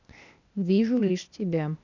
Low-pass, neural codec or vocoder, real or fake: 7.2 kHz; codec, 16 kHz, 0.8 kbps, ZipCodec; fake